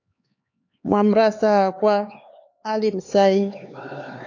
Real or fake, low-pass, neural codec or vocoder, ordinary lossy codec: fake; 7.2 kHz; codec, 16 kHz, 4 kbps, X-Codec, HuBERT features, trained on LibriSpeech; AAC, 48 kbps